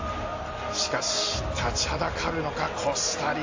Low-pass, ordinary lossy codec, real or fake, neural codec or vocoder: 7.2 kHz; AAC, 48 kbps; real; none